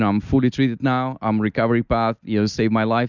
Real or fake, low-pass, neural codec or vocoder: real; 7.2 kHz; none